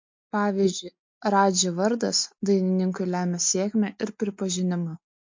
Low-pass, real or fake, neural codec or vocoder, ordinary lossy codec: 7.2 kHz; real; none; MP3, 48 kbps